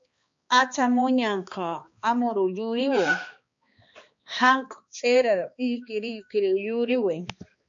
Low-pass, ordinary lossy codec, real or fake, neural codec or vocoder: 7.2 kHz; MP3, 48 kbps; fake; codec, 16 kHz, 2 kbps, X-Codec, HuBERT features, trained on balanced general audio